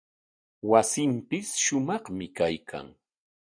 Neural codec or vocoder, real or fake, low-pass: none; real; 9.9 kHz